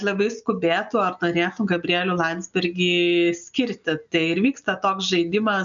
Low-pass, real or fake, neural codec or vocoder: 7.2 kHz; real; none